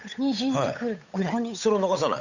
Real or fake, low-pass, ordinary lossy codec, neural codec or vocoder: fake; 7.2 kHz; none; codec, 16 kHz, 8 kbps, FunCodec, trained on Chinese and English, 25 frames a second